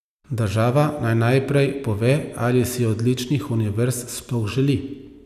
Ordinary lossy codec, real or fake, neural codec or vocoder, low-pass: none; real; none; 14.4 kHz